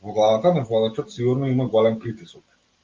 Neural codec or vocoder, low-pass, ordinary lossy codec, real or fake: none; 7.2 kHz; Opus, 32 kbps; real